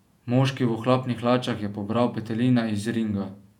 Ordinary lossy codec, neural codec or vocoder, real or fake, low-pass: none; vocoder, 48 kHz, 128 mel bands, Vocos; fake; 19.8 kHz